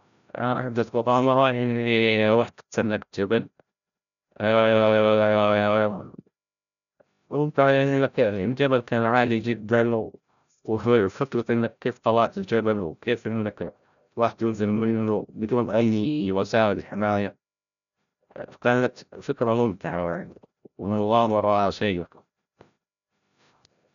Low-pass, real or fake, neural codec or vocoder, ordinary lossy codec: 7.2 kHz; fake; codec, 16 kHz, 0.5 kbps, FreqCodec, larger model; none